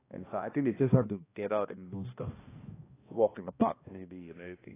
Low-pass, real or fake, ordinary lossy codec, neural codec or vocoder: 3.6 kHz; fake; AAC, 16 kbps; codec, 16 kHz, 1 kbps, X-Codec, HuBERT features, trained on balanced general audio